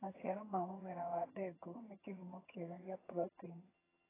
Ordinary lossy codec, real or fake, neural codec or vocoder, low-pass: AAC, 16 kbps; fake; vocoder, 22.05 kHz, 80 mel bands, HiFi-GAN; 3.6 kHz